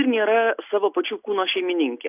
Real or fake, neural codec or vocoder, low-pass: real; none; 3.6 kHz